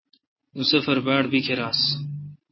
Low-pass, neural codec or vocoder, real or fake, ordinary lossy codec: 7.2 kHz; none; real; MP3, 24 kbps